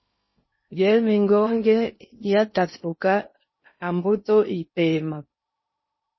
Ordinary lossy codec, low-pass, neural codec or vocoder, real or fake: MP3, 24 kbps; 7.2 kHz; codec, 16 kHz in and 24 kHz out, 0.6 kbps, FocalCodec, streaming, 2048 codes; fake